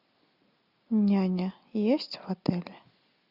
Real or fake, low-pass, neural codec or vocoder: real; 5.4 kHz; none